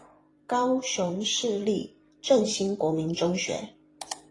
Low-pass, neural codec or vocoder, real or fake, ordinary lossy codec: 10.8 kHz; vocoder, 44.1 kHz, 128 mel bands every 512 samples, BigVGAN v2; fake; AAC, 32 kbps